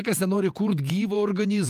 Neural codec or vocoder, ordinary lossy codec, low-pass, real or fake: vocoder, 48 kHz, 128 mel bands, Vocos; Opus, 32 kbps; 14.4 kHz; fake